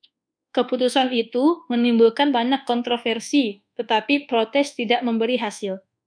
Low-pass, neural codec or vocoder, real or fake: 9.9 kHz; codec, 24 kHz, 1.2 kbps, DualCodec; fake